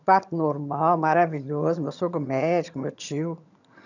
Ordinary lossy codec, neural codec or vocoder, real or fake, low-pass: none; vocoder, 22.05 kHz, 80 mel bands, HiFi-GAN; fake; 7.2 kHz